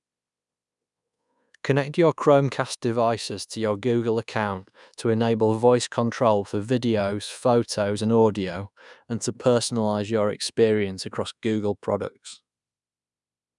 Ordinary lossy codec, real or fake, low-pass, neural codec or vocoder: none; fake; 10.8 kHz; codec, 24 kHz, 1.2 kbps, DualCodec